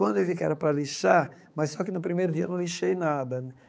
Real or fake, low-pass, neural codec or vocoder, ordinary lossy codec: fake; none; codec, 16 kHz, 4 kbps, X-Codec, HuBERT features, trained on balanced general audio; none